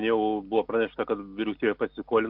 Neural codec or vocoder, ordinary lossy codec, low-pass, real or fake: none; MP3, 48 kbps; 5.4 kHz; real